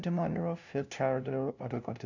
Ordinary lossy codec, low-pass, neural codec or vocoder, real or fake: none; 7.2 kHz; codec, 16 kHz, 0.5 kbps, FunCodec, trained on LibriTTS, 25 frames a second; fake